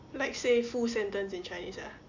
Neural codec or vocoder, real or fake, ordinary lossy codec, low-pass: none; real; MP3, 48 kbps; 7.2 kHz